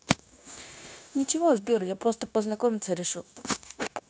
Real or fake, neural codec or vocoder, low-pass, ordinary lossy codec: fake; codec, 16 kHz, 0.9 kbps, LongCat-Audio-Codec; none; none